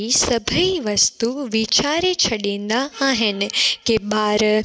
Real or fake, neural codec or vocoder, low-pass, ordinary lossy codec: real; none; none; none